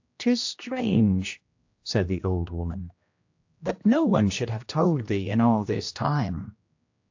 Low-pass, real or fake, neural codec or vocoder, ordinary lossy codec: 7.2 kHz; fake; codec, 16 kHz, 1 kbps, X-Codec, HuBERT features, trained on general audio; AAC, 48 kbps